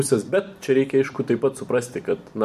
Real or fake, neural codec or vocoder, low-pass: fake; vocoder, 44.1 kHz, 128 mel bands every 256 samples, BigVGAN v2; 14.4 kHz